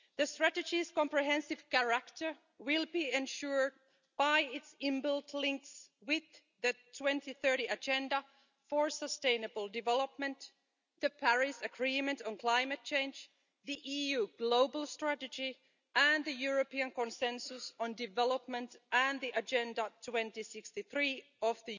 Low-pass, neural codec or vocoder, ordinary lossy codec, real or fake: 7.2 kHz; none; none; real